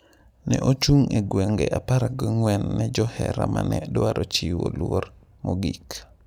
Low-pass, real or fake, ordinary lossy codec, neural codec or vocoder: 19.8 kHz; real; none; none